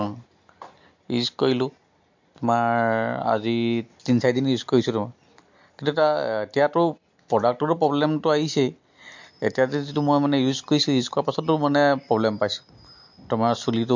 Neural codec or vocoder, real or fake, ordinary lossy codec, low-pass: none; real; MP3, 48 kbps; 7.2 kHz